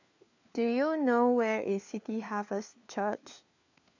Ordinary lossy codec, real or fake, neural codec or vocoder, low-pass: AAC, 48 kbps; fake; codec, 16 kHz, 4 kbps, FunCodec, trained on LibriTTS, 50 frames a second; 7.2 kHz